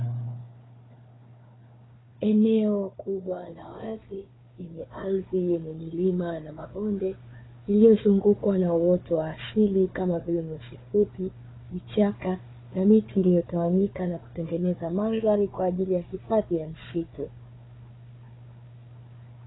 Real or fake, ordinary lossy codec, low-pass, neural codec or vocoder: fake; AAC, 16 kbps; 7.2 kHz; codec, 16 kHz, 4 kbps, FunCodec, trained on Chinese and English, 50 frames a second